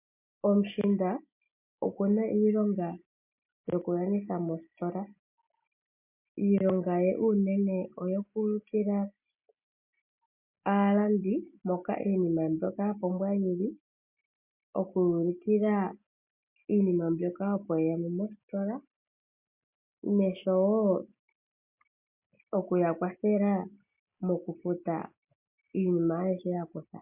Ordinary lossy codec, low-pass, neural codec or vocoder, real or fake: MP3, 32 kbps; 3.6 kHz; none; real